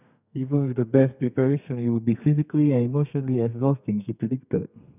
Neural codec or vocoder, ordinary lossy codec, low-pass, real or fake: codec, 32 kHz, 1.9 kbps, SNAC; none; 3.6 kHz; fake